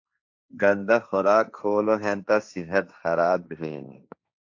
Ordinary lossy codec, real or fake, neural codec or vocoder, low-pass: AAC, 48 kbps; fake; codec, 16 kHz, 1.1 kbps, Voila-Tokenizer; 7.2 kHz